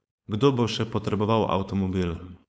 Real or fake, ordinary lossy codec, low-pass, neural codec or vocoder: fake; none; none; codec, 16 kHz, 4.8 kbps, FACodec